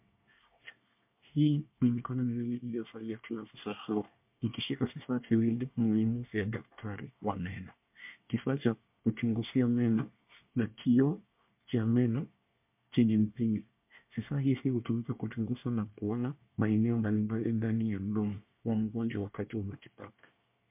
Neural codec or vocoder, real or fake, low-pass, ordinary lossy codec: codec, 24 kHz, 1 kbps, SNAC; fake; 3.6 kHz; MP3, 32 kbps